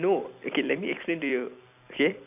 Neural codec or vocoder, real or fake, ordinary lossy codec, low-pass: vocoder, 44.1 kHz, 128 mel bands every 256 samples, BigVGAN v2; fake; none; 3.6 kHz